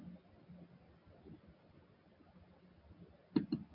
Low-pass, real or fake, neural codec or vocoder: 5.4 kHz; real; none